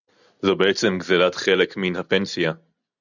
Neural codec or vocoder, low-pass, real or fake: none; 7.2 kHz; real